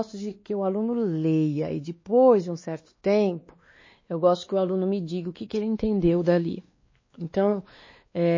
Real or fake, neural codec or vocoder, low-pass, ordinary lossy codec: fake; codec, 16 kHz, 2 kbps, X-Codec, WavLM features, trained on Multilingual LibriSpeech; 7.2 kHz; MP3, 32 kbps